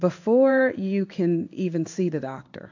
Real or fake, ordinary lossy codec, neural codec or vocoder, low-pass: fake; AAC, 48 kbps; codec, 16 kHz in and 24 kHz out, 1 kbps, XY-Tokenizer; 7.2 kHz